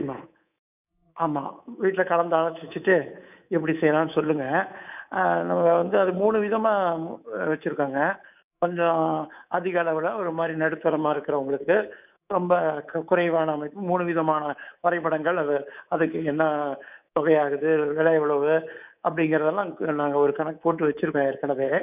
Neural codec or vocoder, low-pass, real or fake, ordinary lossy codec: codec, 24 kHz, 3.1 kbps, DualCodec; 3.6 kHz; fake; none